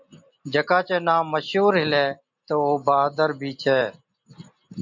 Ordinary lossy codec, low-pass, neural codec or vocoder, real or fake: MP3, 64 kbps; 7.2 kHz; none; real